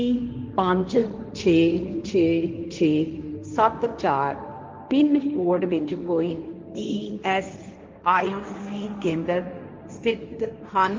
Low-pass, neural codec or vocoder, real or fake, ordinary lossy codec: 7.2 kHz; codec, 16 kHz, 1.1 kbps, Voila-Tokenizer; fake; Opus, 32 kbps